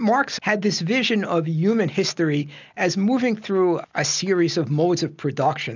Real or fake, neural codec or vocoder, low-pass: real; none; 7.2 kHz